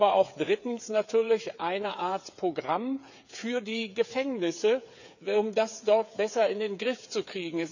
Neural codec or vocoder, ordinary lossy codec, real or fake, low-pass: codec, 16 kHz, 8 kbps, FreqCodec, smaller model; none; fake; 7.2 kHz